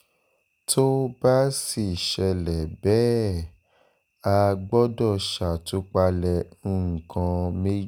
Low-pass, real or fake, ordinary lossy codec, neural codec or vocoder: none; real; none; none